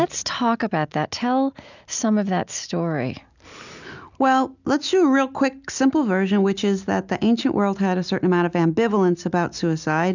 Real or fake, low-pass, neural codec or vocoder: real; 7.2 kHz; none